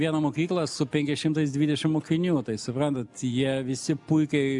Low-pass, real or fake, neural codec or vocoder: 10.8 kHz; real; none